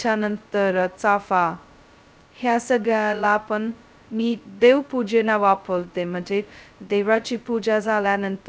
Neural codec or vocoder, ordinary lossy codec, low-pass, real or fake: codec, 16 kHz, 0.2 kbps, FocalCodec; none; none; fake